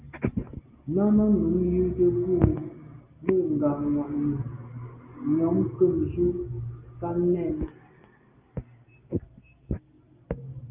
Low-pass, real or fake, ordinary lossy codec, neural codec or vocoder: 3.6 kHz; real; Opus, 24 kbps; none